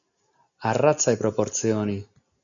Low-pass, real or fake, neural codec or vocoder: 7.2 kHz; real; none